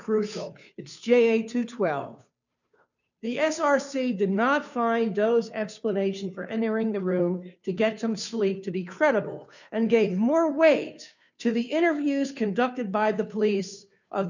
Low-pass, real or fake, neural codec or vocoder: 7.2 kHz; fake; codec, 16 kHz, 2 kbps, FunCodec, trained on Chinese and English, 25 frames a second